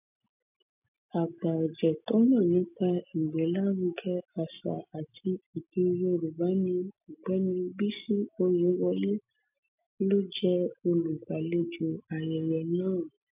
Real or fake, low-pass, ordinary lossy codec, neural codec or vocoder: real; 3.6 kHz; none; none